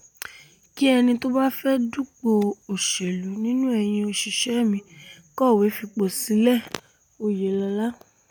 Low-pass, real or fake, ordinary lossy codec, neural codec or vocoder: 19.8 kHz; real; none; none